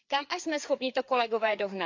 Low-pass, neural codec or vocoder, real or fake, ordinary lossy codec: 7.2 kHz; codec, 16 kHz, 4 kbps, FreqCodec, larger model; fake; AAC, 48 kbps